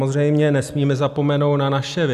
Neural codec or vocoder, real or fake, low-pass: none; real; 14.4 kHz